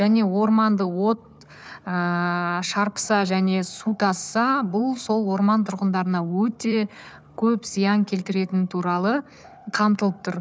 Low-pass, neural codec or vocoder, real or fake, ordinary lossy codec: none; codec, 16 kHz, 4 kbps, FunCodec, trained on Chinese and English, 50 frames a second; fake; none